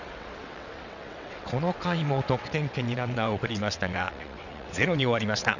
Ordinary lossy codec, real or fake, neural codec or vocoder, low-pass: none; fake; vocoder, 22.05 kHz, 80 mel bands, WaveNeXt; 7.2 kHz